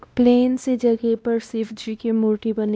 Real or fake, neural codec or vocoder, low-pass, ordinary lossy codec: fake; codec, 16 kHz, 1 kbps, X-Codec, WavLM features, trained on Multilingual LibriSpeech; none; none